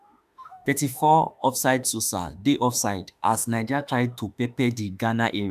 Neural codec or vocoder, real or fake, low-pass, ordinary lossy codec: autoencoder, 48 kHz, 32 numbers a frame, DAC-VAE, trained on Japanese speech; fake; 14.4 kHz; none